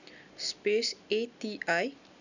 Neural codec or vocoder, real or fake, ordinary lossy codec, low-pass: none; real; none; 7.2 kHz